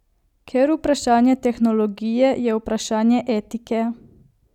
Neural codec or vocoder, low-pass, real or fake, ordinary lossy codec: none; 19.8 kHz; real; none